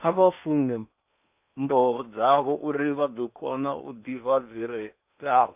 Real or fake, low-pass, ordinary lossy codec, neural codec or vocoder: fake; 3.6 kHz; none; codec, 16 kHz in and 24 kHz out, 0.8 kbps, FocalCodec, streaming, 65536 codes